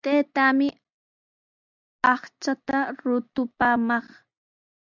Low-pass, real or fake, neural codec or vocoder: 7.2 kHz; real; none